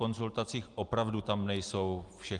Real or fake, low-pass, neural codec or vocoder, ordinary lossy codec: real; 10.8 kHz; none; Opus, 24 kbps